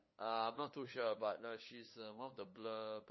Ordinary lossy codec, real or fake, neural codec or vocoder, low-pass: MP3, 24 kbps; fake; codec, 16 kHz, 8 kbps, FunCodec, trained on LibriTTS, 25 frames a second; 7.2 kHz